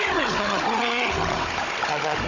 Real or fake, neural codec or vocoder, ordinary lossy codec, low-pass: fake; codec, 16 kHz, 16 kbps, FunCodec, trained on Chinese and English, 50 frames a second; none; 7.2 kHz